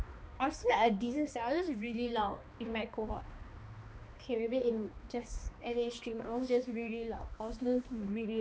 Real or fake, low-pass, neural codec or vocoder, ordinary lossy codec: fake; none; codec, 16 kHz, 2 kbps, X-Codec, HuBERT features, trained on balanced general audio; none